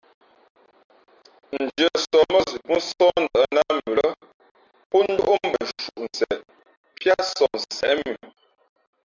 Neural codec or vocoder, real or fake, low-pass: none; real; 7.2 kHz